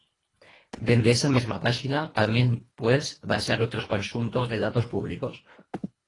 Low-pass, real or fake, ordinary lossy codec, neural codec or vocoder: 10.8 kHz; fake; AAC, 32 kbps; codec, 24 kHz, 1.5 kbps, HILCodec